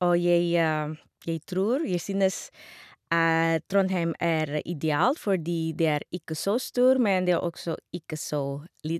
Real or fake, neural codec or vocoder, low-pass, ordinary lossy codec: real; none; 14.4 kHz; none